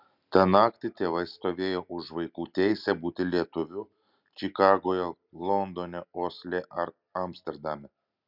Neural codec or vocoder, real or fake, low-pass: none; real; 5.4 kHz